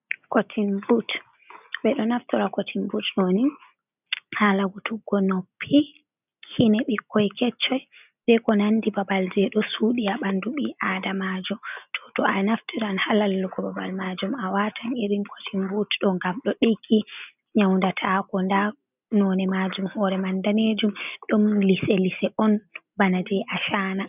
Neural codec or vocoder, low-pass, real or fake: none; 3.6 kHz; real